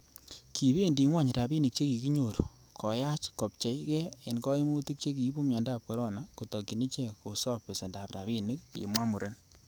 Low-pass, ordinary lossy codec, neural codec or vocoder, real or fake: none; none; codec, 44.1 kHz, 7.8 kbps, DAC; fake